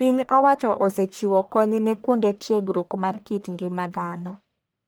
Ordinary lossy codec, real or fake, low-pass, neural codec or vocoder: none; fake; none; codec, 44.1 kHz, 1.7 kbps, Pupu-Codec